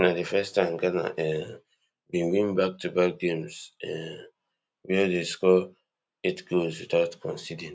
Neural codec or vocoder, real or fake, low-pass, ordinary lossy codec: none; real; none; none